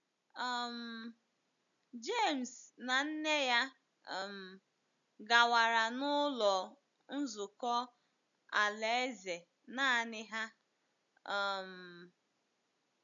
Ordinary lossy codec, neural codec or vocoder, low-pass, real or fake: none; none; 7.2 kHz; real